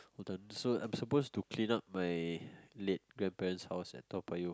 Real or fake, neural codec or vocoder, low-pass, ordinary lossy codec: real; none; none; none